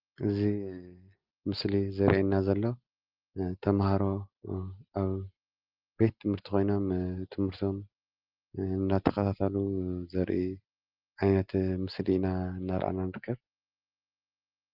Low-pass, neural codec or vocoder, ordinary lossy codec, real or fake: 5.4 kHz; none; Opus, 32 kbps; real